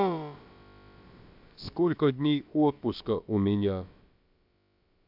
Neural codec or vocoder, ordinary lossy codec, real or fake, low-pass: codec, 16 kHz, about 1 kbps, DyCAST, with the encoder's durations; AAC, 48 kbps; fake; 5.4 kHz